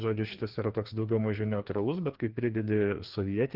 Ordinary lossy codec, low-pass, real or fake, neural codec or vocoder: Opus, 16 kbps; 5.4 kHz; fake; codec, 16 kHz, 2 kbps, FreqCodec, larger model